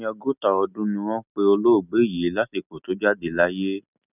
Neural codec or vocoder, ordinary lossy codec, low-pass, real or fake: none; none; 3.6 kHz; real